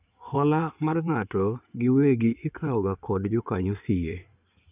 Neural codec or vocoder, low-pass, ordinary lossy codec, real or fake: codec, 16 kHz in and 24 kHz out, 2.2 kbps, FireRedTTS-2 codec; 3.6 kHz; none; fake